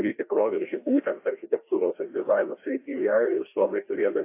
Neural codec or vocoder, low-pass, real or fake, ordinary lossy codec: codec, 16 kHz, 1 kbps, FreqCodec, larger model; 3.6 kHz; fake; AAC, 24 kbps